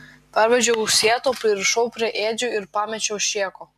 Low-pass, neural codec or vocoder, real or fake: 14.4 kHz; none; real